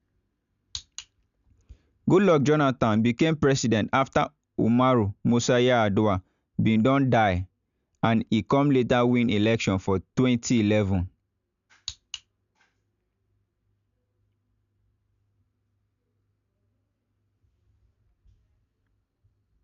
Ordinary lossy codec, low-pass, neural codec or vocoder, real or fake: none; 7.2 kHz; none; real